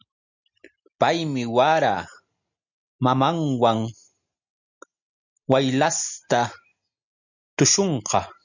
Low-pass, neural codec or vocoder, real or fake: 7.2 kHz; none; real